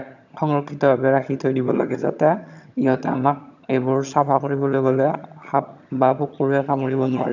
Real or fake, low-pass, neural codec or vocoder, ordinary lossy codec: fake; 7.2 kHz; vocoder, 22.05 kHz, 80 mel bands, HiFi-GAN; none